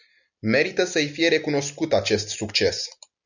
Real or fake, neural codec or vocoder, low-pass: real; none; 7.2 kHz